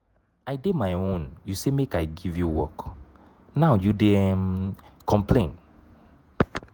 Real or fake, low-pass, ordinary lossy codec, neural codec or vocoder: fake; none; none; vocoder, 48 kHz, 128 mel bands, Vocos